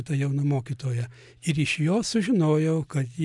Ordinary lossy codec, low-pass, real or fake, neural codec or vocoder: MP3, 96 kbps; 10.8 kHz; real; none